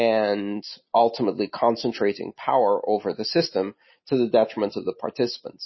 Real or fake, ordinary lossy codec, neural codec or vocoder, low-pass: real; MP3, 24 kbps; none; 7.2 kHz